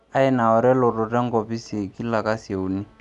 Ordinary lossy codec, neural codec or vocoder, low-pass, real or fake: none; none; 10.8 kHz; real